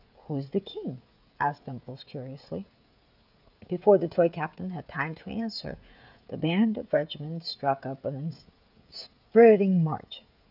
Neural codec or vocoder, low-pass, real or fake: codec, 16 kHz, 16 kbps, FreqCodec, smaller model; 5.4 kHz; fake